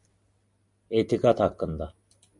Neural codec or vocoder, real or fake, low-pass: none; real; 10.8 kHz